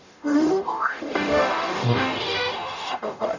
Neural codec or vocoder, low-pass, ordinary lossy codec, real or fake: codec, 44.1 kHz, 0.9 kbps, DAC; 7.2 kHz; none; fake